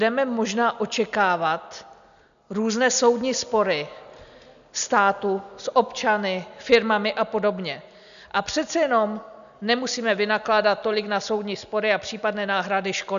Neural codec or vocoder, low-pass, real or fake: none; 7.2 kHz; real